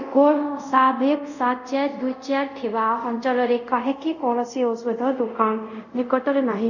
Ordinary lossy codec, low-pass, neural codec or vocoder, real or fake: none; 7.2 kHz; codec, 24 kHz, 0.5 kbps, DualCodec; fake